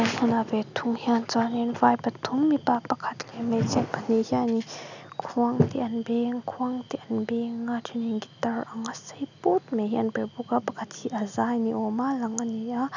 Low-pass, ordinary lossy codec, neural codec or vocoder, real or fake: 7.2 kHz; none; none; real